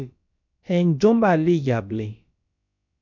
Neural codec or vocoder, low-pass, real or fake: codec, 16 kHz, about 1 kbps, DyCAST, with the encoder's durations; 7.2 kHz; fake